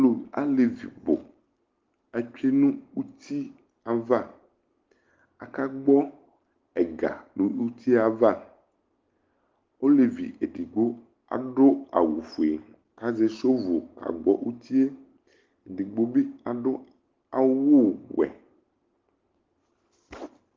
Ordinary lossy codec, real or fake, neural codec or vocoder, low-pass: Opus, 16 kbps; real; none; 7.2 kHz